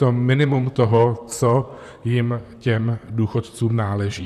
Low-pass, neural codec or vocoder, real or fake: 14.4 kHz; vocoder, 44.1 kHz, 128 mel bands, Pupu-Vocoder; fake